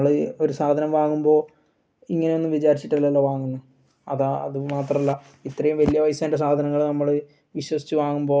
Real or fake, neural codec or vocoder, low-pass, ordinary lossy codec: real; none; none; none